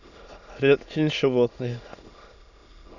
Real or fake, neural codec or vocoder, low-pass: fake; autoencoder, 22.05 kHz, a latent of 192 numbers a frame, VITS, trained on many speakers; 7.2 kHz